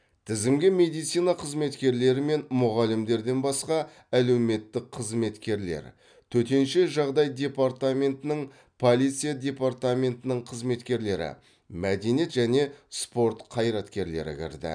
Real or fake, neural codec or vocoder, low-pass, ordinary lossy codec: real; none; 9.9 kHz; none